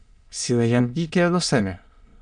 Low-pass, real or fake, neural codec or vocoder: 9.9 kHz; fake; autoencoder, 22.05 kHz, a latent of 192 numbers a frame, VITS, trained on many speakers